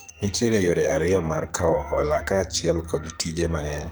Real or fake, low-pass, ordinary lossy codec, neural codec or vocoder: fake; none; none; codec, 44.1 kHz, 2.6 kbps, SNAC